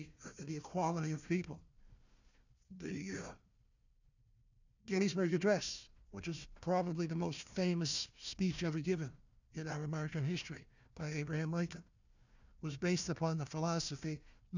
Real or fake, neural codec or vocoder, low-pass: fake; codec, 16 kHz, 1 kbps, FunCodec, trained on Chinese and English, 50 frames a second; 7.2 kHz